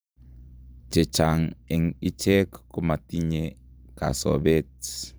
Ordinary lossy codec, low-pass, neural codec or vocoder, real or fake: none; none; vocoder, 44.1 kHz, 128 mel bands every 512 samples, BigVGAN v2; fake